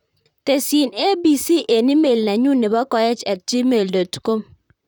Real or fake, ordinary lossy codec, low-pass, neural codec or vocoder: fake; none; 19.8 kHz; vocoder, 44.1 kHz, 128 mel bands, Pupu-Vocoder